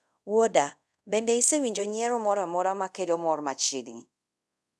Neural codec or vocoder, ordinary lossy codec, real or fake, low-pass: codec, 24 kHz, 0.5 kbps, DualCodec; none; fake; none